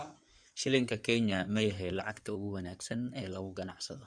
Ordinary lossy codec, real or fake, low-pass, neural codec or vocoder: none; fake; 9.9 kHz; codec, 16 kHz in and 24 kHz out, 2.2 kbps, FireRedTTS-2 codec